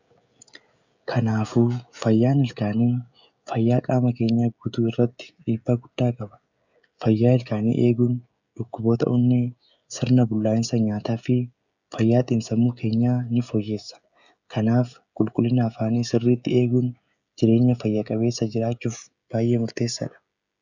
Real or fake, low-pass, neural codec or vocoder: fake; 7.2 kHz; codec, 16 kHz, 16 kbps, FreqCodec, smaller model